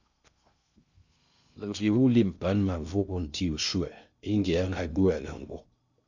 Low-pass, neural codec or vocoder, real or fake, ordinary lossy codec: 7.2 kHz; codec, 16 kHz in and 24 kHz out, 0.6 kbps, FocalCodec, streaming, 2048 codes; fake; Opus, 64 kbps